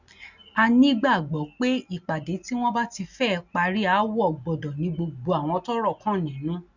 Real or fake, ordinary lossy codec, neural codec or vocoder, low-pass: real; none; none; 7.2 kHz